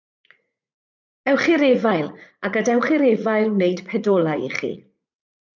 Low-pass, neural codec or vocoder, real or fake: 7.2 kHz; vocoder, 44.1 kHz, 80 mel bands, Vocos; fake